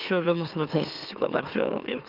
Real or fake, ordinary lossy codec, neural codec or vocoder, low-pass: fake; Opus, 24 kbps; autoencoder, 44.1 kHz, a latent of 192 numbers a frame, MeloTTS; 5.4 kHz